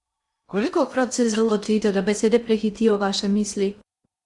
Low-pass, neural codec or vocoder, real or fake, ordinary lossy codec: 10.8 kHz; codec, 16 kHz in and 24 kHz out, 0.6 kbps, FocalCodec, streaming, 2048 codes; fake; Opus, 64 kbps